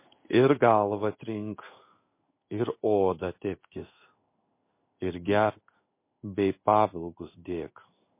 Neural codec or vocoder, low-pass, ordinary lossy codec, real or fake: codec, 16 kHz in and 24 kHz out, 1 kbps, XY-Tokenizer; 3.6 kHz; MP3, 24 kbps; fake